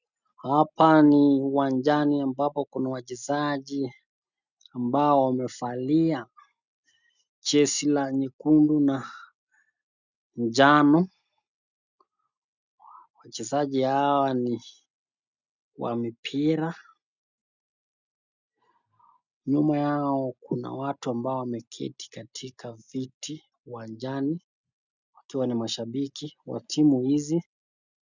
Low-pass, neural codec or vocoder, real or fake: 7.2 kHz; none; real